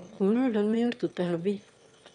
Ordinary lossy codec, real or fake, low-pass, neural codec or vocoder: none; fake; 9.9 kHz; autoencoder, 22.05 kHz, a latent of 192 numbers a frame, VITS, trained on one speaker